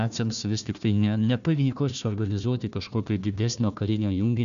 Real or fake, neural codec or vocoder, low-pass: fake; codec, 16 kHz, 1 kbps, FunCodec, trained on Chinese and English, 50 frames a second; 7.2 kHz